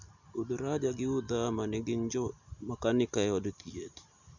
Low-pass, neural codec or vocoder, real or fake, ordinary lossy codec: 7.2 kHz; none; real; none